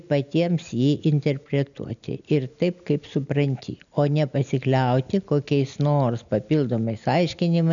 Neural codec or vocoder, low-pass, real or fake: none; 7.2 kHz; real